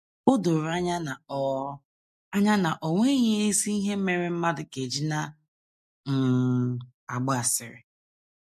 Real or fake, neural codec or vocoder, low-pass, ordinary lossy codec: real; none; 14.4 kHz; MP3, 64 kbps